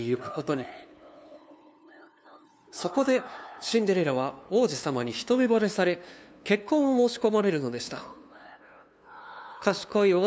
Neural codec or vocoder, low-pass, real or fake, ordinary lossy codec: codec, 16 kHz, 2 kbps, FunCodec, trained on LibriTTS, 25 frames a second; none; fake; none